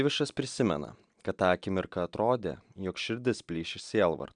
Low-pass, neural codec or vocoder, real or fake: 9.9 kHz; none; real